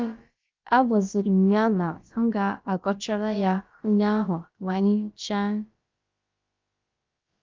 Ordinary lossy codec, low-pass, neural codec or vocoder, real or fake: Opus, 24 kbps; 7.2 kHz; codec, 16 kHz, about 1 kbps, DyCAST, with the encoder's durations; fake